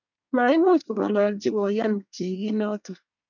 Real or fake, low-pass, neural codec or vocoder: fake; 7.2 kHz; codec, 24 kHz, 1 kbps, SNAC